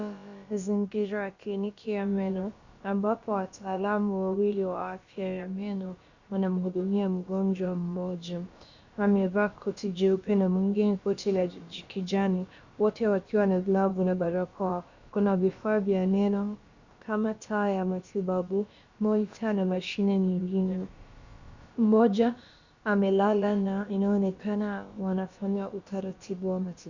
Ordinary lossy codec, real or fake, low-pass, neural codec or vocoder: Opus, 64 kbps; fake; 7.2 kHz; codec, 16 kHz, about 1 kbps, DyCAST, with the encoder's durations